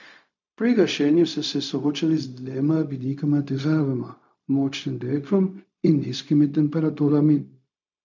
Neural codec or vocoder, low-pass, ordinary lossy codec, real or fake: codec, 16 kHz, 0.4 kbps, LongCat-Audio-Codec; 7.2 kHz; none; fake